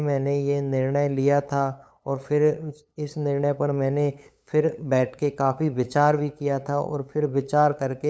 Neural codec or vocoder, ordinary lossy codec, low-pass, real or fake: codec, 16 kHz, 8 kbps, FunCodec, trained on LibriTTS, 25 frames a second; none; none; fake